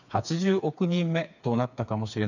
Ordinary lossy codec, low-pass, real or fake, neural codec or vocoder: none; 7.2 kHz; fake; codec, 16 kHz, 4 kbps, FreqCodec, smaller model